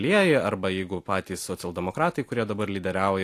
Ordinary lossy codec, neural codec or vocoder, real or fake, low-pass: AAC, 64 kbps; none; real; 14.4 kHz